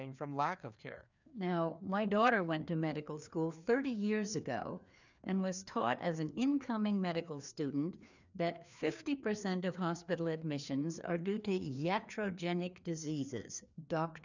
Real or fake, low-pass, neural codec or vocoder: fake; 7.2 kHz; codec, 16 kHz, 2 kbps, FreqCodec, larger model